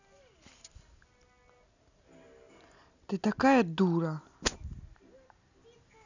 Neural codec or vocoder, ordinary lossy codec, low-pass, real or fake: none; none; 7.2 kHz; real